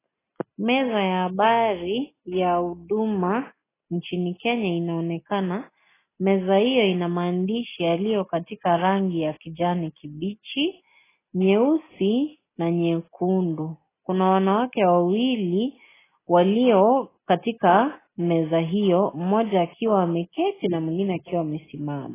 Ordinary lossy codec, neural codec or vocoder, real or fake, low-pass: AAC, 16 kbps; none; real; 3.6 kHz